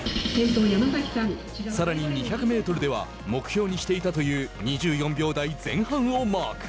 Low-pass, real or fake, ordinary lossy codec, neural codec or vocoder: none; real; none; none